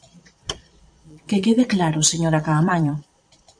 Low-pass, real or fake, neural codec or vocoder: 9.9 kHz; fake; vocoder, 22.05 kHz, 80 mel bands, Vocos